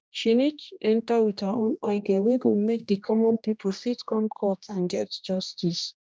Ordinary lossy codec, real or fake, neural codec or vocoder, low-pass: none; fake; codec, 16 kHz, 1 kbps, X-Codec, HuBERT features, trained on general audio; none